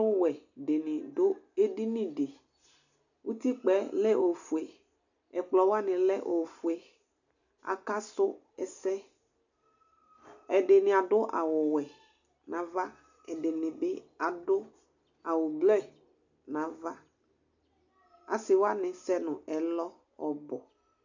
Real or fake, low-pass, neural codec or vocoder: real; 7.2 kHz; none